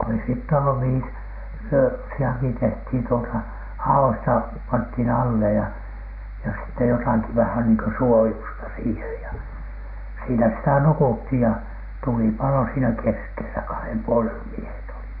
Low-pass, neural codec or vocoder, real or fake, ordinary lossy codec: 5.4 kHz; none; real; none